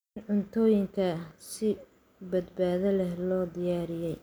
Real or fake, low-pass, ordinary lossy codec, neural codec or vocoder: real; none; none; none